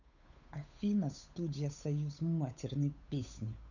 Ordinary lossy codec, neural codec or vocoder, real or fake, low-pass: none; codec, 16 kHz, 8 kbps, FunCodec, trained on Chinese and English, 25 frames a second; fake; 7.2 kHz